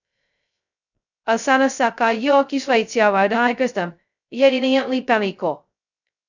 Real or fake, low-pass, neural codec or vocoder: fake; 7.2 kHz; codec, 16 kHz, 0.2 kbps, FocalCodec